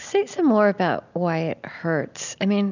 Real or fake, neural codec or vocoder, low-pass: real; none; 7.2 kHz